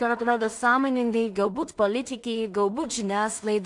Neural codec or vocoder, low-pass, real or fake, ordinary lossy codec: codec, 16 kHz in and 24 kHz out, 0.4 kbps, LongCat-Audio-Codec, two codebook decoder; 10.8 kHz; fake; AAC, 64 kbps